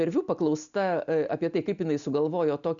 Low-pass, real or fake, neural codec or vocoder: 7.2 kHz; real; none